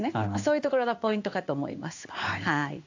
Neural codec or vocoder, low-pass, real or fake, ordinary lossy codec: codec, 16 kHz in and 24 kHz out, 1 kbps, XY-Tokenizer; 7.2 kHz; fake; AAC, 48 kbps